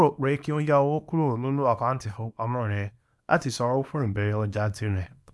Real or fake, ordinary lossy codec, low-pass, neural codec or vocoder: fake; none; none; codec, 24 kHz, 0.9 kbps, WavTokenizer, small release